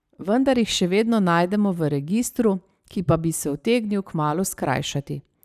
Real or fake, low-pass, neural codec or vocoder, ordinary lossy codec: real; 14.4 kHz; none; none